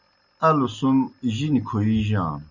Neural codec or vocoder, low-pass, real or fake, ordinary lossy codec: none; 7.2 kHz; real; Opus, 64 kbps